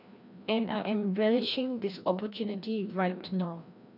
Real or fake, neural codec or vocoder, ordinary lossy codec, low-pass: fake; codec, 16 kHz, 1 kbps, FreqCodec, larger model; none; 5.4 kHz